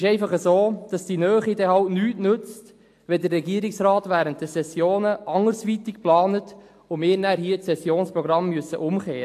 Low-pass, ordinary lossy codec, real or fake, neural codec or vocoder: 14.4 kHz; AAC, 64 kbps; real; none